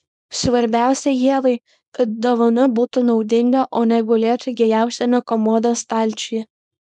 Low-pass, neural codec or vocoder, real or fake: 10.8 kHz; codec, 24 kHz, 0.9 kbps, WavTokenizer, small release; fake